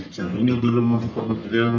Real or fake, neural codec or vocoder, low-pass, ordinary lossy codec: fake; codec, 44.1 kHz, 1.7 kbps, Pupu-Codec; 7.2 kHz; AAC, 48 kbps